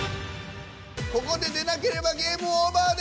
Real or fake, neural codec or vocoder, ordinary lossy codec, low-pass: real; none; none; none